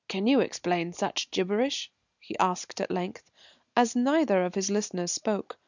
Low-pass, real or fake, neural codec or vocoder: 7.2 kHz; real; none